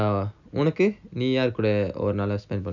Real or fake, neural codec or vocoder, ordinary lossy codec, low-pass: real; none; none; 7.2 kHz